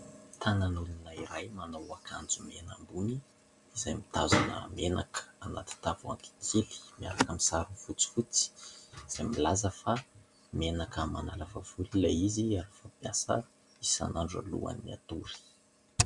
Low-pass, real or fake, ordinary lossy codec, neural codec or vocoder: 10.8 kHz; real; MP3, 96 kbps; none